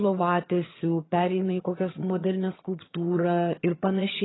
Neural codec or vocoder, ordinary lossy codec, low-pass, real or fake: vocoder, 22.05 kHz, 80 mel bands, HiFi-GAN; AAC, 16 kbps; 7.2 kHz; fake